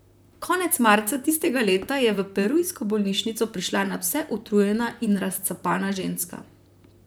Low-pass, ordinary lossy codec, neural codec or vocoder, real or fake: none; none; vocoder, 44.1 kHz, 128 mel bands, Pupu-Vocoder; fake